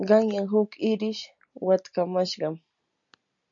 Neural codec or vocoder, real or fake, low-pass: none; real; 7.2 kHz